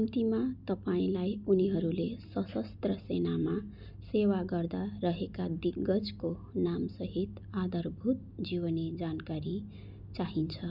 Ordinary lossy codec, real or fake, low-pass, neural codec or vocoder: none; real; 5.4 kHz; none